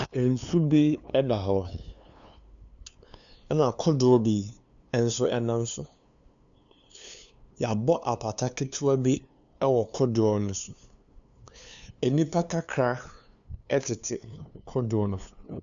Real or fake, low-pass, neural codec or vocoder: fake; 7.2 kHz; codec, 16 kHz, 2 kbps, FunCodec, trained on LibriTTS, 25 frames a second